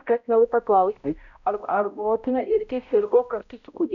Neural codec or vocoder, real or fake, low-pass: codec, 16 kHz, 0.5 kbps, X-Codec, HuBERT features, trained on balanced general audio; fake; 7.2 kHz